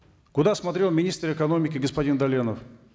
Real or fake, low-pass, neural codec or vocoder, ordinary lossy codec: real; none; none; none